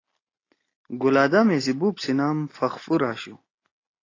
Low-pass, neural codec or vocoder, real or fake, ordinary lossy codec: 7.2 kHz; none; real; AAC, 32 kbps